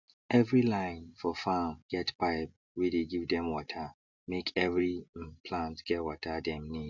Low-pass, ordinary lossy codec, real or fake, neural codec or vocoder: 7.2 kHz; none; real; none